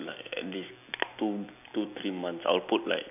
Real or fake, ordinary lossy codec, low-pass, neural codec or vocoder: real; none; 3.6 kHz; none